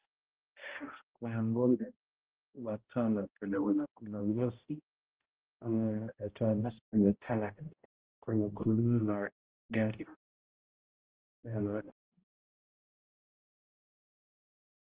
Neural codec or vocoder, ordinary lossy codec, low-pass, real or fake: codec, 16 kHz, 0.5 kbps, X-Codec, HuBERT features, trained on balanced general audio; Opus, 16 kbps; 3.6 kHz; fake